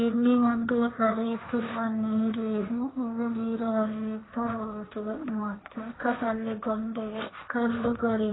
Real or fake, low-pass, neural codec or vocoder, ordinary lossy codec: fake; 7.2 kHz; codec, 16 kHz, 1.1 kbps, Voila-Tokenizer; AAC, 16 kbps